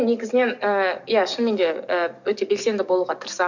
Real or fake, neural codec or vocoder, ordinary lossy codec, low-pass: fake; codec, 44.1 kHz, 7.8 kbps, DAC; none; 7.2 kHz